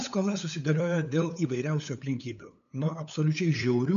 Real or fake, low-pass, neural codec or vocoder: fake; 7.2 kHz; codec, 16 kHz, 8 kbps, FunCodec, trained on LibriTTS, 25 frames a second